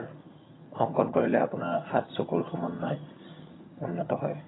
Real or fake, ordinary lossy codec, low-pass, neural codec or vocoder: fake; AAC, 16 kbps; 7.2 kHz; vocoder, 22.05 kHz, 80 mel bands, HiFi-GAN